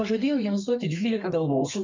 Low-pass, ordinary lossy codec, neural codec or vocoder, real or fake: 7.2 kHz; MP3, 64 kbps; codec, 32 kHz, 1.9 kbps, SNAC; fake